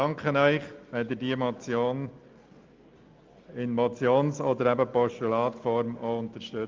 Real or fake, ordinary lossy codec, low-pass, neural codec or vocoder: real; Opus, 32 kbps; 7.2 kHz; none